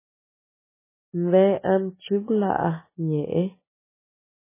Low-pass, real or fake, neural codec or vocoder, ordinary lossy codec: 3.6 kHz; real; none; MP3, 16 kbps